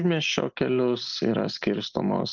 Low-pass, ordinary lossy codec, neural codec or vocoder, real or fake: 7.2 kHz; Opus, 24 kbps; none; real